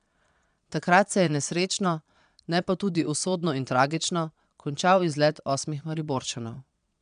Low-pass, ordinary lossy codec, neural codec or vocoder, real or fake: 9.9 kHz; MP3, 96 kbps; vocoder, 22.05 kHz, 80 mel bands, Vocos; fake